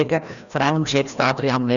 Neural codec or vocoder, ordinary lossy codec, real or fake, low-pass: codec, 16 kHz, 1 kbps, FreqCodec, larger model; none; fake; 7.2 kHz